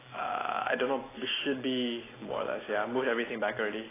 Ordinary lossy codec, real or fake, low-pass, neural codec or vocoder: AAC, 16 kbps; real; 3.6 kHz; none